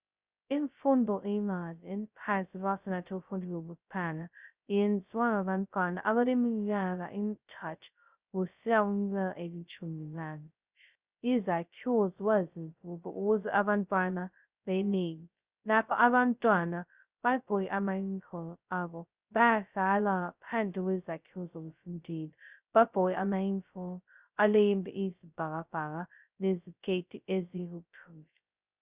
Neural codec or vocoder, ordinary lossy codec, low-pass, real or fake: codec, 16 kHz, 0.2 kbps, FocalCodec; Opus, 64 kbps; 3.6 kHz; fake